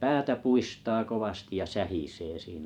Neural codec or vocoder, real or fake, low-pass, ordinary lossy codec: vocoder, 44.1 kHz, 128 mel bands every 512 samples, BigVGAN v2; fake; 19.8 kHz; none